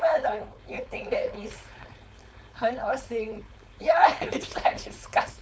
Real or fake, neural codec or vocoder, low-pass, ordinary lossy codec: fake; codec, 16 kHz, 4.8 kbps, FACodec; none; none